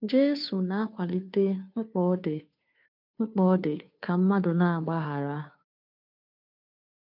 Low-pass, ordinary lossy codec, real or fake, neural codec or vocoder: 5.4 kHz; none; fake; codec, 16 kHz, 2 kbps, FunCodec, trained on Chinese and English, 25 frames a second